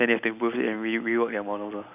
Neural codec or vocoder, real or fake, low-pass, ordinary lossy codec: none; real; 3.6 kHz; none